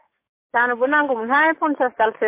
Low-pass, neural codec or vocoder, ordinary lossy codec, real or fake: 3.6 kHz; codec, 44.1 kHz, 7.8 kbps, DAC; MP3, 24 kbps; fake